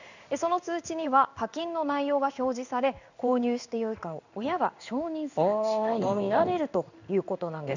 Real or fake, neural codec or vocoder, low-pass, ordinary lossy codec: fake; codec, 16 kHz in and 24 kHz out, 1 kbps, XY-Tokenizer; 7.2 kHz; none